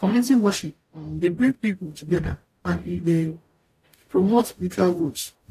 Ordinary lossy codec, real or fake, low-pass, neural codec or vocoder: AAC, 64 kbps; fake; 14.4 kHz; codec, 44.1 kHz, 0.9 kbps, DAC